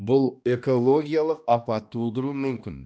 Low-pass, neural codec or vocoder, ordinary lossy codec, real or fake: none; codec, 16 kHz, 1 kbps, X-Codec, HuBERT features, trained on balanced general audio; none; fake